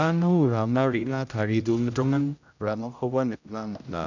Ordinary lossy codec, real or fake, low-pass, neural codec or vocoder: none; fake; 7.2 kHz; codec, 16 kHz, 0.5 kbps, X-Codec, HuBERT features, trained on general audio